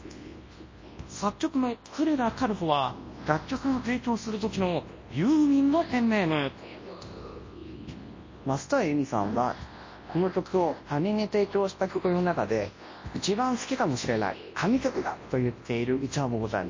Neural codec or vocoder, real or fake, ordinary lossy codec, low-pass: codec, 24 kHz, 0.9 kbps, WavTokenizer, large speech release; fake; MP3, 32 kbps; 7.2 kHz